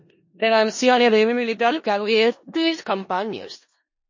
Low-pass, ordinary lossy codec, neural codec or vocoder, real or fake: 7.2 kHz; MP3, 32 kbps; codec, 16 kHz in and 24 kHz out, 0.4 kbps, LongCat-Audio-Codec, four codebook decoder; fake